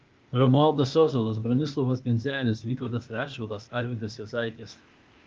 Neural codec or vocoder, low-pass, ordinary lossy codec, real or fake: codec, 16 kHz, 0.8 kbps, ZipCodec; 7.2 kHz; Opus, 24 kbps; fake